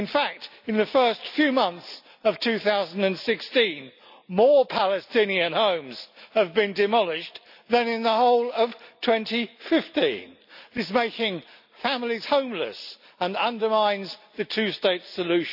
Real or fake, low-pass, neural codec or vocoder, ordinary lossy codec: real; 5.4 kHz; none; none